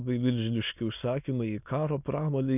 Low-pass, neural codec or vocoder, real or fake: 3.6 kHz; autoencoder, 22.05 kHz, a latent of 192 numbers a frame, VITS, trained on many speakers; fake